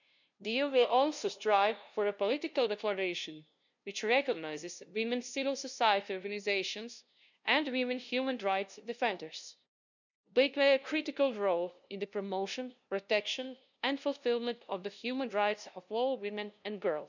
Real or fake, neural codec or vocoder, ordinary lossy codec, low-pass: fake; codec, 16 kHz, 0.5 kbps, FunCodec, trained on LibriTTS, 25 frames a second; none; 7.2 kHz